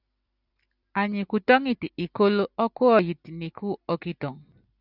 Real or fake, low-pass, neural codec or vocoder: real; 5.4 kHz; none